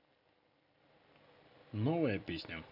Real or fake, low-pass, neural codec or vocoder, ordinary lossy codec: real; 5.4 kHz; none; none